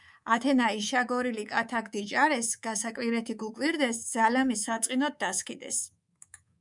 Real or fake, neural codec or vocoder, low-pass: fake; codec, 24 kHz, 3.1 kbps, DualCodec; 10.8 kHz